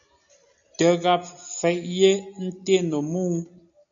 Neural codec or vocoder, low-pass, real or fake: none; 7.2 kHz; real